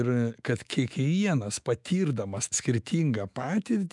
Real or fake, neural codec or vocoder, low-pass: fake; autoencoder, 48 kHz, 128 numbers a frame, DAC-VAE, trained on Japanese speech; 10.8 kHz